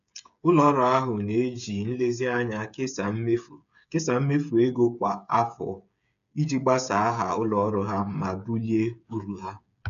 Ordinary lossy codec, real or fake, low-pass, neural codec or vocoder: none; fake; 7.2 kHz; codec, 16 kHz, 8 kbps, FreqCodec, smaller model